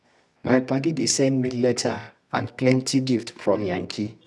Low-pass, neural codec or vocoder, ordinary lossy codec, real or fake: none; codec, 24 kHz, 0.9 kbps, WavTokenizer, medium music audio release; none; fake